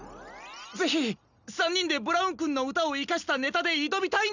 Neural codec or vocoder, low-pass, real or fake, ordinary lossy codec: none; 7.2 kHz; real; none